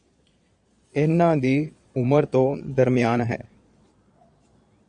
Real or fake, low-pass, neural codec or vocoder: fake; 9.9 kHz; vocoder, 22.05 kHz, 80 mel bands, Vocos